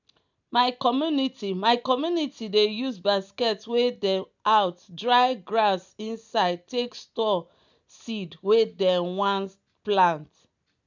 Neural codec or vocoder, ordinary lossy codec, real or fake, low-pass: none; none; real; 7.2 kHz